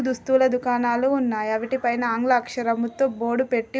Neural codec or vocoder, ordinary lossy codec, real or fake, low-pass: none; none; real; none